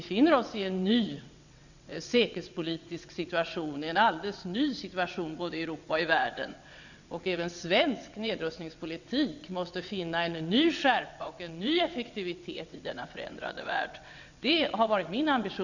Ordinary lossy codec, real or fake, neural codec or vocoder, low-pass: none; fake; vocoder, 22.05 kHz, 80 mel bands, WaveNeXt; 7.2 kHz